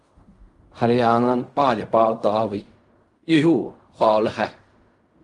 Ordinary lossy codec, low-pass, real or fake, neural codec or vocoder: Opus, 32 kbps; 10.8 kHz; fake; codec, 16 kHz in and 24 kHz out, 0.4 kbps, LongCat-Audio-Codec, fine tuned four codebook decoder